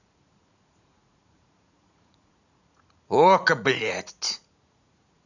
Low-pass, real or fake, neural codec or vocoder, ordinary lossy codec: 7.2 kHz; fake; vocoder, 44.1 kHz, 80 mel bands, Vocos; none